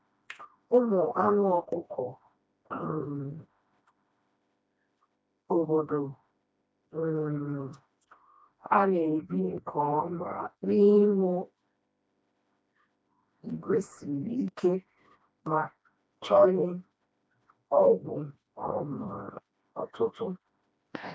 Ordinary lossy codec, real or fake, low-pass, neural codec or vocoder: none; fake; none; codec, 16 kHz, 1 kbps, FreqCodec, smaller model